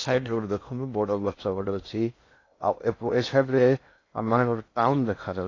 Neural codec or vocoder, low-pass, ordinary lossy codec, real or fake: codec, 16 kHz in and 24 kHz out, 0.6 kbps, FocalCodec, streaming, 2048 codes; 7.2 kHz; AAC, 32 kbps; fake